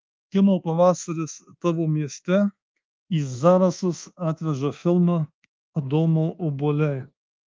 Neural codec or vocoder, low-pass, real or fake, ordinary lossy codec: codec, 24 kHz, 1.2 kbps, DualCodec; 7.2 kHz; fake; Opus, 32 kbps